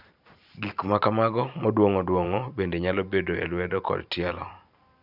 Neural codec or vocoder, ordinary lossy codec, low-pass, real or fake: vocoder, 44.1 kHz, 128 mel bands every 512 samples, BigVGAN v2; none; 5.4 kHz; fake